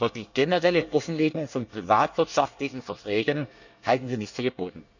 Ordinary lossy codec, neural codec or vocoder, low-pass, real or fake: none; codec, 24 kHz, 1 kbps, SNAC; 7.2 kHz; fake